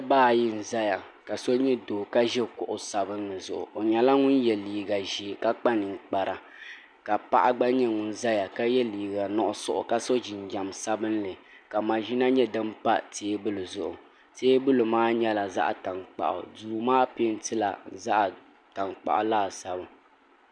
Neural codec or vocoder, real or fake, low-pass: none; real; 9.9 kHz